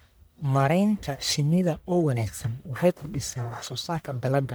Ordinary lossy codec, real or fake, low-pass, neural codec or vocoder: none; fake; none; codec, 44.1 kHz, 1.7 kbps, Pupu-Codec